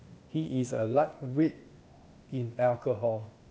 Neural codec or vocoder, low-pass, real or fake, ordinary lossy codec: codec, 16 kHz, 0.8 kbps, ZipCodec; none; fake; none